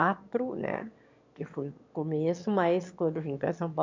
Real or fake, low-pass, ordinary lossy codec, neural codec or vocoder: fake; 7.2 kHz; MP3, 64 kbps; autoencoder, 22.05 kHz, a latent of 192 numbers a frame, VITS, trained on one speaker